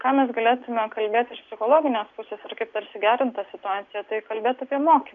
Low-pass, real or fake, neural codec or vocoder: 7.2 kHz; real; none